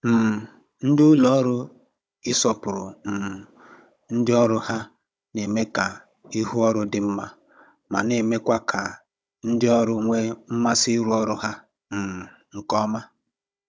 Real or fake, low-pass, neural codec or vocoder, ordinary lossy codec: fake; none; codec, 16 kHz, 16 kbps, FunCodec, trained on Chinese and English, 50 frames a second; none